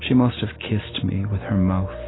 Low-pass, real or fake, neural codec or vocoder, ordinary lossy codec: 7.2 kHz; real; none; AAC, 16 kbps